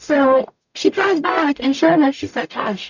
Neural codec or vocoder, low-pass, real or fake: codec, 44.1 kHz, 0.9 kbps, DAC; 7.2 kHz; fake